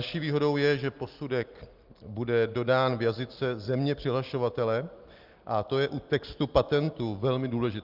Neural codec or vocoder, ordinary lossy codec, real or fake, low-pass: none; Opus, 32 kbps; real; 5.4 kHz